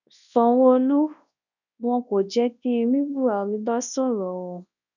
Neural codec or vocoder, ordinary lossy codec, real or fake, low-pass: codec, 24 kHz, 0.9 kbps, WavTokenizer, large speech release; none; fake; 7.2 kHz